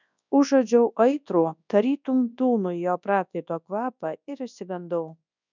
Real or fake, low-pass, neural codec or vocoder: fake; 7.2 kHz; codec, 24 kHz, 0.9 kbps, WavTokenizer, large speech release